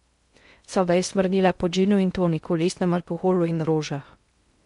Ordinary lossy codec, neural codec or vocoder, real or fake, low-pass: MP3, 64 kbps; codec, 16 kHz in and 24 kHz out, 0.6 kbps, FocalCodec, streaming, 2048 codes; fake; 10.8 kHz